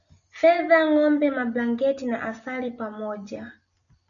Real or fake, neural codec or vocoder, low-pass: real; none; 7.2 kHz